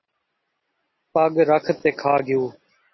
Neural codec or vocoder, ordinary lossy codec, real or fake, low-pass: none; MP3, 24 kbps; real; 7.2 kHz